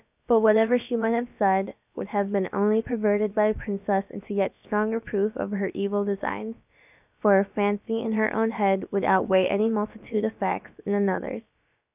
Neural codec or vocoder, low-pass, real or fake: codec, 16 kHz, about 1 kbps, DyCAST, with the encoder's durations; 3.6 kHz; fake